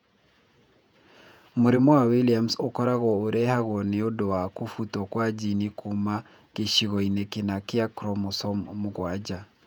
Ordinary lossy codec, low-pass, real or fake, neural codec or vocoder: none; 19.8 kHz; fake; vocoder, 48 kHz, 128 mel bands, Vocos